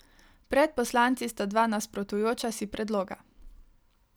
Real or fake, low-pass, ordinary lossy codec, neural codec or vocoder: real; none; none; none